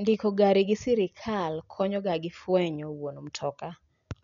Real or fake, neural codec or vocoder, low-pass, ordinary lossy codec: real; none; 7.2 kHz; none